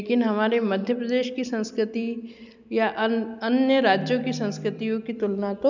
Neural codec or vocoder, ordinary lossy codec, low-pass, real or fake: none; none; 7.2 kHz; real